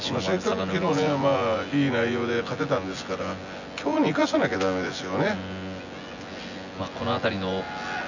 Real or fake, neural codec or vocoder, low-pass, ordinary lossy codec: fake; vocoder, 24 kHz, 100 mel bands, Vocos; 7.2 kHz; none